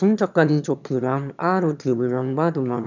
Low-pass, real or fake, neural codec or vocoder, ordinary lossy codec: 7.2 kHz; fake; autoencoder, 22.05 kHz, a latent of 192 numbers a frame, VITS, trained on one speaker; none